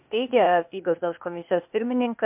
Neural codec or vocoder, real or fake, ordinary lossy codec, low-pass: codec, 16 kHz, 0.8 kbps, ZipCodec; fake; MP3, 32 kbps; 3.6 kHz